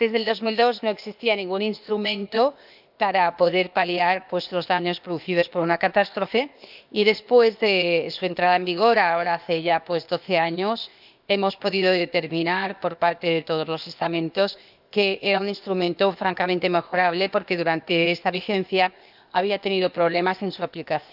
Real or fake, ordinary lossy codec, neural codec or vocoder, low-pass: fake; none; codec, 16 kHz, 0.8 kbps, ZipCodec; 5.4 kHz